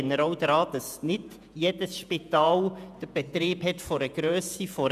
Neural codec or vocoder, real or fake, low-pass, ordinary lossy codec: vocoder, 48 kHz, 128 mel bands, Vocos; fake; 14.4 kHz; none